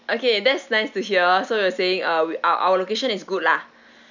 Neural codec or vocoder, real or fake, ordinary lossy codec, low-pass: none; real; none; 7.2 kHz